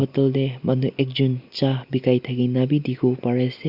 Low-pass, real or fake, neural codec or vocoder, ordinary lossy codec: 5.4 kHz; real; none; Opus, 64 kbps